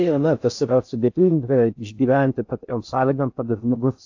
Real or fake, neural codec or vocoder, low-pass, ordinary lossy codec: fake; codec, 16 kHz in and 24 kHz out, 0.6 kbps, FocalCodec, streaming, 4096 codes; 7.2 kHz; AAC, 48 kbps